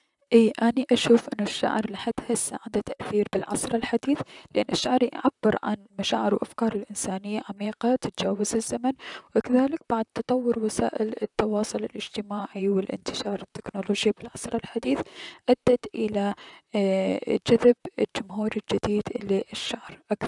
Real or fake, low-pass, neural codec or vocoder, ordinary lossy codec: fake; 10.8 kHz; vocoder, 44.1 kHz, 128 mel bands, Pupu-Vocoder; none